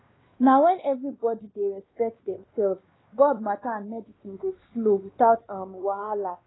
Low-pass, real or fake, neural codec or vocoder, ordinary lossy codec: 7.2 kHz; fake; codec, 16 kHz, 2 kbps, X-Codec, WavLM features, trained on Multilingual LibriSpeech; AAC, 16 kbps